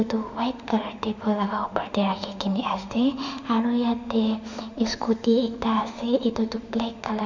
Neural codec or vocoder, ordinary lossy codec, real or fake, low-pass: autoencoder, 48 kHz, 32 numbers a frame, DAC-VAE, trained on Japanese speech; none; fake; 7.2 kHz